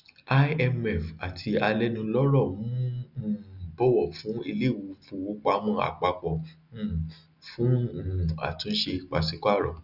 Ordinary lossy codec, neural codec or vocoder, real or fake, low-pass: none; none; real; 5.4 kHz